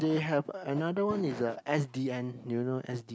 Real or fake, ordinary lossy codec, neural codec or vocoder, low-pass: real; none; none; none